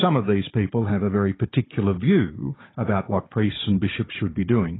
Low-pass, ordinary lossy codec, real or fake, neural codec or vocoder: 7.2 kHz; AAC, 16 kbps; fake; codec, 16 kHz, 16 kbps, FunCodec, trained on Chinese and English, 50 frames a second